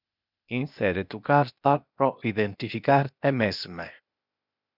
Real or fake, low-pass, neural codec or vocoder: fake; 5.4 kHz; codec, 16 kHz, 0.8 kbps, ZipCodec